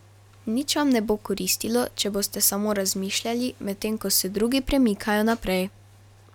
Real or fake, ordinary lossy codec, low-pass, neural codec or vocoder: real; none; 19.8 kHz; none